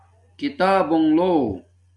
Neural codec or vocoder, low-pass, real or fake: none; 10.8 kHz; real